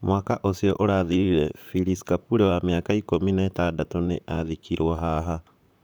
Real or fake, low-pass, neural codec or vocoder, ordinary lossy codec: fake; none; vocoder, 44.1 kHz, 128 mel bands, Pupu-Vocoder; none